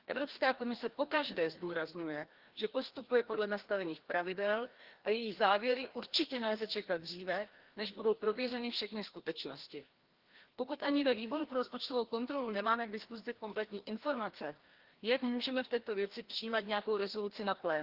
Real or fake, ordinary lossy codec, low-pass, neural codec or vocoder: fake; Opus, 16 kbps; 5.4 kHz; codec, 16 kHz, 1 kbps, FreqCodec, larger model